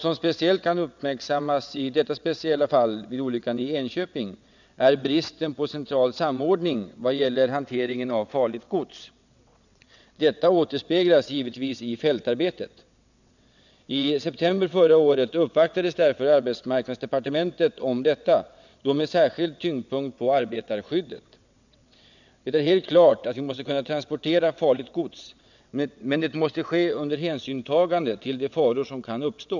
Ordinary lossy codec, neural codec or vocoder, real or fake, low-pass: none; vocoder, 22.05 kHz, 80 mel bands, WaveNeXt; fake; 7.2 kHz